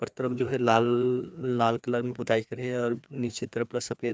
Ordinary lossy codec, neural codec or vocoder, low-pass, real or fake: none; codec, 16 kHz, 2 kbps, FreqCodec, larger model; none; fake